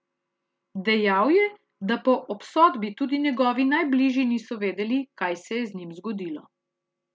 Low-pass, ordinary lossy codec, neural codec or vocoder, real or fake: none; none; none; real